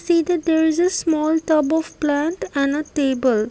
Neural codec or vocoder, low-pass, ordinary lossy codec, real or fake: none; none; none; real